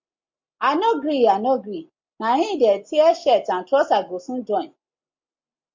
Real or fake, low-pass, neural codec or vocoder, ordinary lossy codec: real; 7.2 kHz; none; MP3, 48 kbps